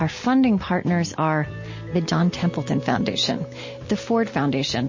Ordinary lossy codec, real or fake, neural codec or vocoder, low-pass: MP3, 32 kbps; real; none; 7.2 kHz